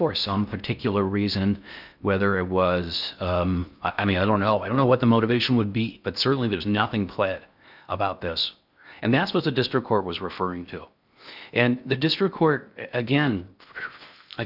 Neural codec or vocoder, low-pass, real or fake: codec, 16 kHz in and 24 kHz out, 0.6 kbps, FocalCodec, streaming, 4096 codes; 5.4 kHz; fake